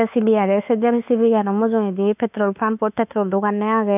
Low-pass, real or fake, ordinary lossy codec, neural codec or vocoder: 3.6 kHz; fake; none; autoencoder, 48 kHz, 32 numbers a frame, DAC-VAE, trained on Japanese speech